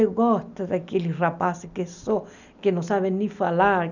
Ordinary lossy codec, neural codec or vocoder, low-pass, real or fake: none; none; 7.2 kHz; real